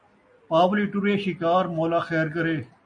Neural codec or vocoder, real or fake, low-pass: none; real; 9.9 kHz